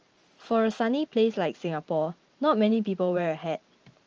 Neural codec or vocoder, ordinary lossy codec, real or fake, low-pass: vocoder, 44.1 kHz, 128 mel bands every 512 samples, BigVGAN v2; Opus, 24 kbps; fake; 7.2 kHz